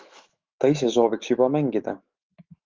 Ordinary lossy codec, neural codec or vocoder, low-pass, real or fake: Opus, 32 kbps; autoencoder, 48 kHz, 128 numbers a frame, DAC-VAE, trained on Japanese speech; 7.2 kHz; fake